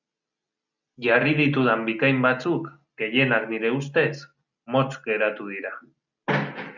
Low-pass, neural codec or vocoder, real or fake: 7.2 kHz; none; real